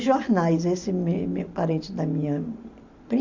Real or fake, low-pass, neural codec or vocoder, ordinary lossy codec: real; 7.2 kHz; none; MP3, 64 kbps